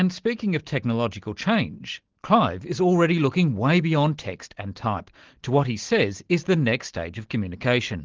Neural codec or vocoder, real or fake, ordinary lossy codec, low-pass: none; real; Opus, 16 kbps; 7.2 kHz